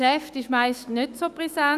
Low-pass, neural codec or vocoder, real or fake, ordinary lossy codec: 14.4 kHz; autoencoder, 48 kHz, 128 numbers a frame, DAC-VAE, trained on Japanese speech; fake; Opus, 64 kbps